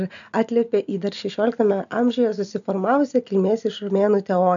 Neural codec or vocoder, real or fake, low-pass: none; real; 7.2 kHz